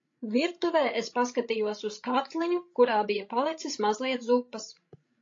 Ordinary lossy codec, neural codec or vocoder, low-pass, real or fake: AAC, 48 kbps; codec, 16 kHz, 16 kbps, FreqCodec, larger model; 7.2 kHz; fake